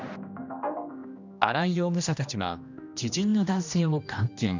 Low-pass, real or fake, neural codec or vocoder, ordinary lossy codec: 7.2 kHz; fake; codec, 16 kHz, 1 kbps, X-Codec, HuBERT features, trained on general audio; none